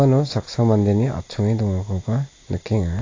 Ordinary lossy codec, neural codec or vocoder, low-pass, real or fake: AAC, 32 kbps; none; 7.2 kHz; real